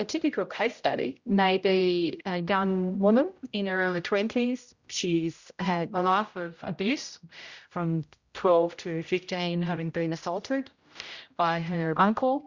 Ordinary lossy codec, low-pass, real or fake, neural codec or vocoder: Opus, 64 kbps; 7.2 kHz; fake; codec, 16 kHz, 0.5 kbps, X-Codec, HuBERT features, trained on general audio